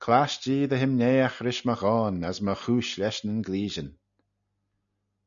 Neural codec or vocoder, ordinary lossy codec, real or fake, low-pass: none; MP3, 96 kbps; real; 7.2 kHz